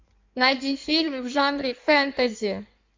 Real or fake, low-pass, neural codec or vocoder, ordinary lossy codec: fake; 7.2 kHz; codec, 16 kHz in and 24 kHz out, 1.1 kbps, FireRedTTS-2 codec; MP3, 48 kbps